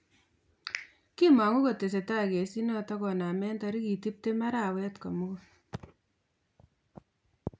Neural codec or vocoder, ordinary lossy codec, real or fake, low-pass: none; none; real; none